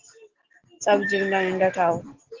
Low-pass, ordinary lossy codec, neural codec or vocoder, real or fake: 7.2 kHz; Opus, 16 kbps; none; real